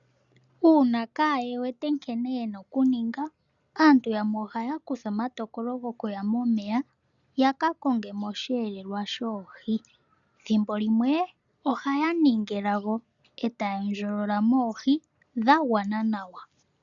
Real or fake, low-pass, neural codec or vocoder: real; 7.2 kHz; none